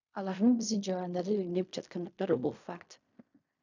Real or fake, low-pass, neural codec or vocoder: fake; 7.2 kHz; codec, 16 kHz in and 24 kHz out, 0.4 kbps, LongCat-Audio-Codec, fine tuned four codebook decoder